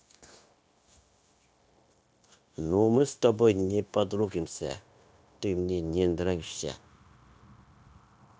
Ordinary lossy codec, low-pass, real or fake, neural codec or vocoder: none; none; fake; codec, 16 kHz, 0.9 kbps, LongCat-Audio-Codec